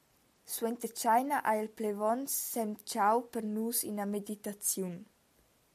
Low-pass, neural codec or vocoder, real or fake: 14.4 kHz; none; real